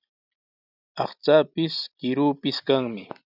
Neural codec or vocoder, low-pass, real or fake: none; 5.4 kHz; real